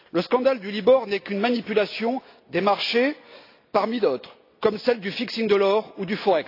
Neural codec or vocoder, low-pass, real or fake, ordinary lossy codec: none; 5.4 kHz; real; AAC, 32 kbps